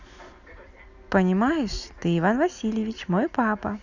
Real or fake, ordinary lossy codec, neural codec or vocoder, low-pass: real; none; none; 7.2 kHz